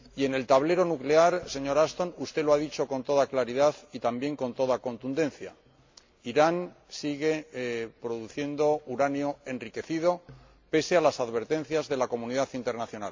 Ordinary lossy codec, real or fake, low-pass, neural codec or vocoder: MP3, 48 kbps; real; 7.2 kHz; none